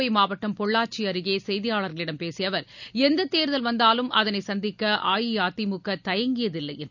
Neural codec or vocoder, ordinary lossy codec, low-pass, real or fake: none; none; 7.2 kHz; real